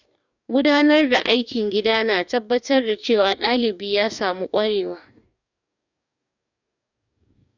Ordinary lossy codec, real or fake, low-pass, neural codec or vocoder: none; fake; 7.2 kHz; codec, 44.1 kHz, 2.6 kbps, DAC